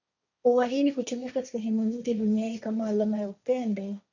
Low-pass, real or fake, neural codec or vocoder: 7.2 kHz; fake; codec, 16 kHz, 1.1 kbps, Voila-Tokenizer